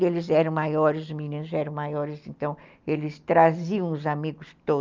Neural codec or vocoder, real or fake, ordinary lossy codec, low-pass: none; real; Opus, 24 kbps; 7.2 kHz